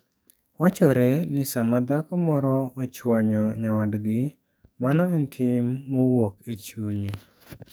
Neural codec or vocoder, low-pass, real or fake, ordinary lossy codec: codec, 44.1 kHz, 2.6 kbps, SNAC; none; fake; none